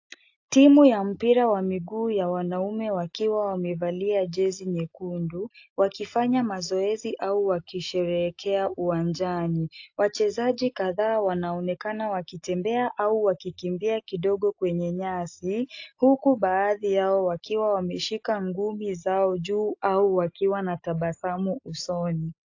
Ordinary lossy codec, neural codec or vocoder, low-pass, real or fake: AAC, 48 kbps; none; 7.2 kHz; real